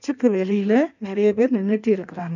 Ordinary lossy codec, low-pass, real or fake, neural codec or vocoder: none; 7.2 kHz; fake; codec, 16 kHz in and 24 kHz out, 1.1 kbps, FireRedTTS-2 codec